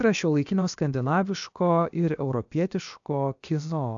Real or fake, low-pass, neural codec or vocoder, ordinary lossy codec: fake; 7.2 kHz; codec, 16 kHz, about 1 kbps, DyCAST, with the encoder's durations; AAC, 64 kbps